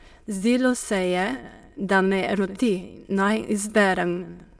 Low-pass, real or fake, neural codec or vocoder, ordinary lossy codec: none; fake; autoencoder, 22.05 kHz, a latent of 192 numbers a frame, VITS, trained on many speakers; none